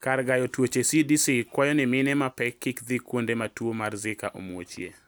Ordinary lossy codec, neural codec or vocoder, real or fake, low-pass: none; none; real; none